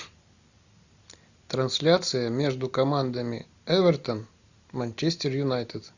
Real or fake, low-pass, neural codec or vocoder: real; 7.2 kHz; none